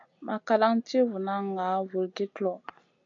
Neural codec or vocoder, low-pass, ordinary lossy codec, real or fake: none; 7.2 kHz; AAC, 64 kbps; real